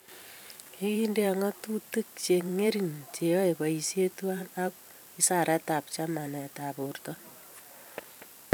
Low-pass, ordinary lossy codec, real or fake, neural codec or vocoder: none; none; fake; vocoder, 44.1 kHz, 128 mel bands every 512 samples, BigVGAN v2